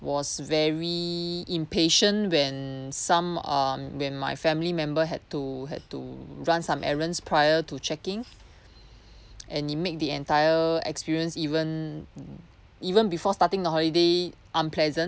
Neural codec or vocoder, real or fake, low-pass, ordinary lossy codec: none; real; none; none